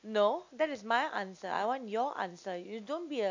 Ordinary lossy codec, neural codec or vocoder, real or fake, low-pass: AAC, 48 kbps; none; real; 7.2 kHz